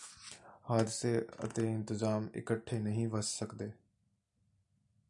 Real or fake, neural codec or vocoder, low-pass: real; none; 10.8 kHz